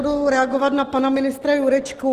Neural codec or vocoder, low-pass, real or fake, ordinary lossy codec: none; 14.4 kHz; real; Opus, 16 kbps